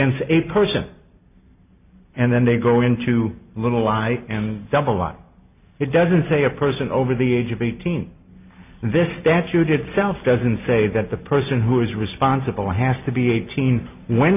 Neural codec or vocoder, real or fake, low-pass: none; real; 3.6 kHz